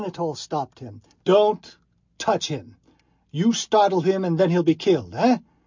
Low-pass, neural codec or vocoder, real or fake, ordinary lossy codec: 7.2 kHz; none; real; MP3, 64 kbps